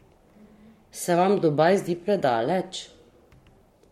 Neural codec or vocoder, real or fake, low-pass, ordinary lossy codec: codec, 44.1 kHz, 7.8 kbps, Pupu-Codec; fake; 19.8 kHz; MP3, 64 kbps